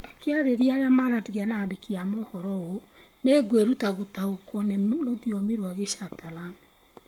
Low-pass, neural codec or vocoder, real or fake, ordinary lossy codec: 19.8 kHz; codec, 44.1 kHz, 7.8 kbps, Pupu-Codec; fake; none